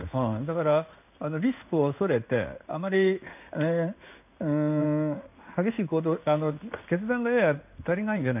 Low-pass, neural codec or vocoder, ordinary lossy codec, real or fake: 3.6 kHz; codec, 16 kHz in and 24 kHz out, 1 kbps, XY-Tokenizer; none; fake